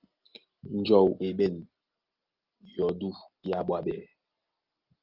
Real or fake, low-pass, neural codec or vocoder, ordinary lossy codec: real; 5.4 kHz; none; Opus, 32 kbps